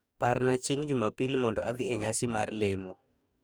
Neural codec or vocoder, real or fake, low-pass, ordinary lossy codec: codec, 44.1 kHz, 2.6 kbps, DAC; fake; none; none